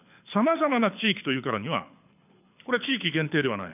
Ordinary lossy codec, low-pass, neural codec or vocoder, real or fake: none; 3.6 kHz; codec, 24 kHz, 6 kbps, HILCodec; fake